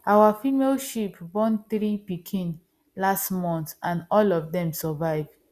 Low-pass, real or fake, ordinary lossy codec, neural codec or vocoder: 14.4 kHz; real; Opus, 64 kbps; none